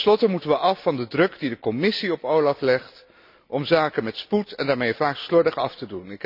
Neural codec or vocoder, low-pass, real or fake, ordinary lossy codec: none; 5.4 kHz; real; none